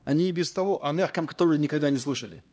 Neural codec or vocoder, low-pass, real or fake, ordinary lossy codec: codec, 16 kHz, 1 kbps, X-Codec, HuBERT features, trained on LibriSpeech; none; fake; none